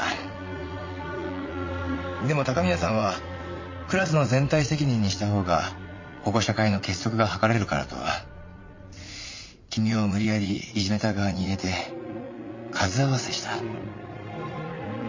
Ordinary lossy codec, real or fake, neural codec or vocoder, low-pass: MP3, 32 kbps; fake; vocoder, 22.05 kHz, 80 mel bands, Vocos; 7.2 kHz